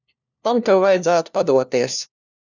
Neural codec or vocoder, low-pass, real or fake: codec, 16 kHz, 1 kbps, FunCodec, trained on LibriTTS, 50 frames a second; 7.2 kHz; fake